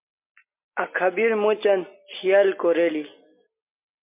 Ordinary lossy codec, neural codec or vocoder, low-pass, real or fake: MP3, 32 kbps; none; 3.6 kHz; real